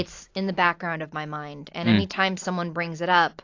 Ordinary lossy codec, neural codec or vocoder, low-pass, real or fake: AAC, 48 kbps; vocoder, 44.1 kHz, 128 mel bands every 256 samples, BigVGAN v2; 7.2 kHz; fake